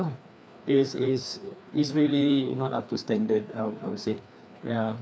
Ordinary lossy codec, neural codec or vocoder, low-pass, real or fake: none; codec, 16 kHz, 2 kbps, FreqCodec, larger model; none; fake